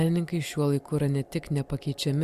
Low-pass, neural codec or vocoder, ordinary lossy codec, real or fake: 14.4 kHz; none; Opus, 64 kbps; real